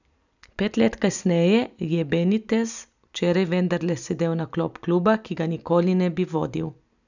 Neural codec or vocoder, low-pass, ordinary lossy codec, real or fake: none; 7.2 kHz; none; real